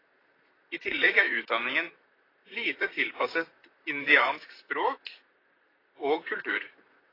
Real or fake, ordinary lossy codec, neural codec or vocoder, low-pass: fake; AAC, 24 kbps; vocoder, 44.1 kHz, 128 mel bands, Pupu-Vocoder; 5.4 kHz